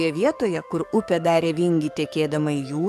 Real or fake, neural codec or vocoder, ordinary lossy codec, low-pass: fake; codec, 44.1 kHz, 7.8 kbps, DAC; AAC, 96 kbps; 14.4 kHz